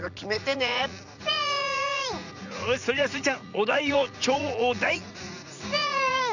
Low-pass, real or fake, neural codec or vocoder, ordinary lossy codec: 7.2 kHz; fake; vocoder, 44.1 kHz, 80 mel bands, Vocos; none